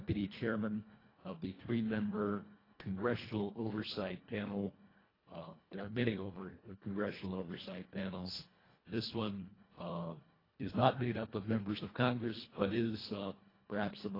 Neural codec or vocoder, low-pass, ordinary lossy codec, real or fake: codec, 24 kHz, 1.5 kbps, HILCodec; 5.4 kHz; AAC, 24 kbps; fake